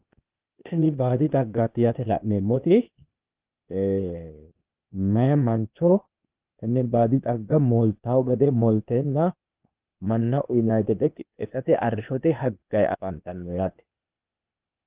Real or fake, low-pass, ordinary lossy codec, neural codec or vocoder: fake; 3.6 kHz; Opus, 32 kbps; codec, 16 kHz, 0.8 kbps, ZipCodec